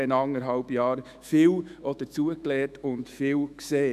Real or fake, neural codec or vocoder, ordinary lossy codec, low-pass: fake; autoencoder, 48 kHz, 128 numbers a frame, DAC-VAE, trained on Japanese speech; none; 14.4 kHz